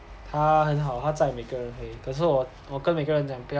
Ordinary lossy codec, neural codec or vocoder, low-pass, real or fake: none; none; none; real